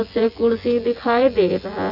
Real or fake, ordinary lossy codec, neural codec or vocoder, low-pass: fake; none; vocoder, 24 kHz, 100 mel bands, Vocos; 5.4 kHz